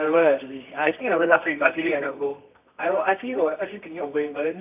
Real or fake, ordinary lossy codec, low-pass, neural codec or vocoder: fake; none; 3.6 kHz; codec, 24 kHz, 0.9 kbps, WavTokenizer, medium music audio release